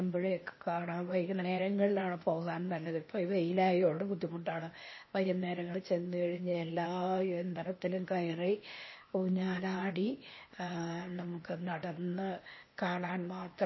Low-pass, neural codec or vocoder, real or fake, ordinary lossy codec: 7.2 kHz; codec, 16 kHz, 0.8 kbps, ZipCodec; fake; MP3, 24 kbps